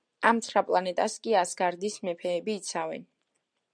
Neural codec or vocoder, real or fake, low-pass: none; real; 9.9 kHz